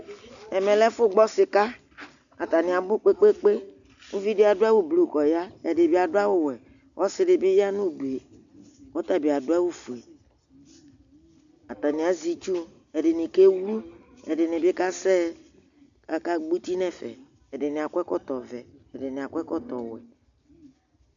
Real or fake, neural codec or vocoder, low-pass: real; none; 7.2 kHz